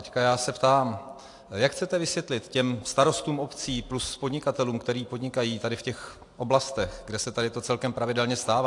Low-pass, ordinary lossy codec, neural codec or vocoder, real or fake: 10.8 kHz; AAC, 64 kbps; none; real